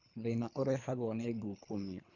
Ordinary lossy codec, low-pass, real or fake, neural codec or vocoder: none; 7.2 kHz; fake; codec, 24 kHz, 3 kbps, HILCodec